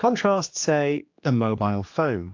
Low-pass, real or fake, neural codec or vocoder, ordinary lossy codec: 7.2 kHz; fake; codec, 16 kHz, 2 kbps, X-Codec, HuBERT features, trained on general audio; AAC, 48 kbps